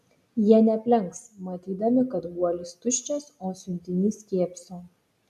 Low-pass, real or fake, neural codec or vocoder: 14.4 kHz; real; none